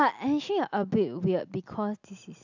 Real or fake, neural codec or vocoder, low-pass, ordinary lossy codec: real; none; 7.2 kHz; none